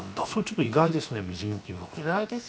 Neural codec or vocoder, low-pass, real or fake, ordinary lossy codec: codec, 16 kHz, 0.7 kbps, FocalCodec; none; fake; none